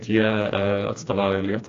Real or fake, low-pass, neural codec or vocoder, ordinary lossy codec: fake; 7.2 kHz; codec, 16 kHz, 2 kbps, FreqCodec, smaller model; AAC, 48 kbps